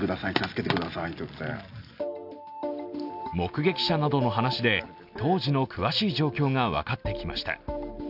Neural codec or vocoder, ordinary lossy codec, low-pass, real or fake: none; none; 5.4 kHz; real